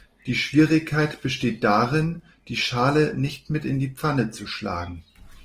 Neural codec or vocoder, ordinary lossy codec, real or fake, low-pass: none; Opus, 24 kbps; real; 14.4 kHz